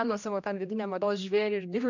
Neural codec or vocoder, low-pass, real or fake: codec, 16 kHz, 1 kbps, X-Codec, HuBERT features, trained on general audio; 7.2 kHz; fake